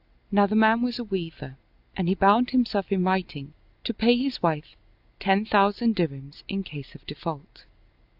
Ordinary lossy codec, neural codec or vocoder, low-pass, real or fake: AAC, 48 kbps; none; 5.4 kHz; real